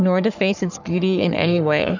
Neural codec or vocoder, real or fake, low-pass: codec, 44.1 kHz, 3.4 kbps, Pupu-Codec; fake; 7.2 kHz